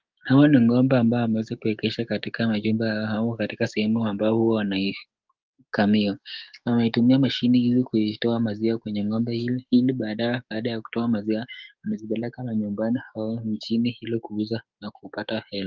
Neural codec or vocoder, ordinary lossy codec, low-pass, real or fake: codec, 16 kHz, 6 kbps, DAC; Opus, 32 kbps; 7.2 kHz; fake